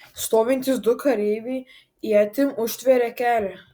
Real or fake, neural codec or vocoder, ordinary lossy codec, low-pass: real; none; Opus, 64 kbps; 19.8 kHz